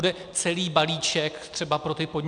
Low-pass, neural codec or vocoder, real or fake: 9.9 kHz; none; real